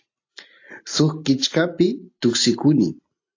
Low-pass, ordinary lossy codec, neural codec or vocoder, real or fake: 7.2 kHz; AAC, 48 kbps; none; real